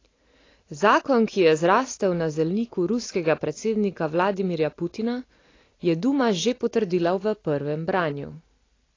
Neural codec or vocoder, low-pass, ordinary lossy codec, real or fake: none; 7.2 kHz; AAC, 32 kbps; real